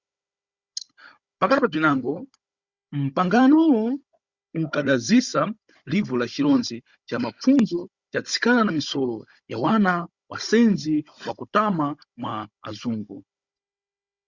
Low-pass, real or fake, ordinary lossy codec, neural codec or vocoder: 7.2 kHz; fake; Opus, 64 kbps; codec, 16 kHz, 16 kbps, FunCodec, trained on Chinese and English, 50 frames a second